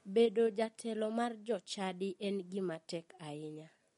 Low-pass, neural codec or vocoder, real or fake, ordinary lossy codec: 14.4 kHz; none; real; MP3, 48 kbps